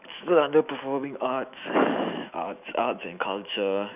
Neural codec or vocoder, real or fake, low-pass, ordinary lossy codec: autoencoder, 48 kHz, 128 numbers a frame, DAC-VAE, trained on Japanese speech; fake; 3.6 kHz; none